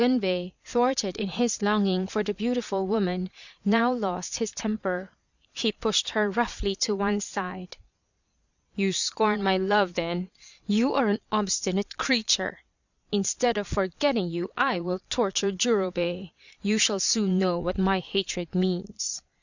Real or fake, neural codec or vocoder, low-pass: fake; vocoder, 22.05 kHz, 80 mel bands, Vocos; 7.2 kHz